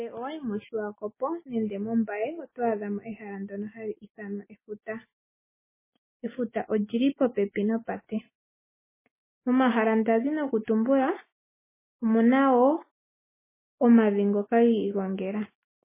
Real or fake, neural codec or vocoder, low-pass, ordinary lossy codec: real; none; 3.6 kHz; MP3, 16 kbps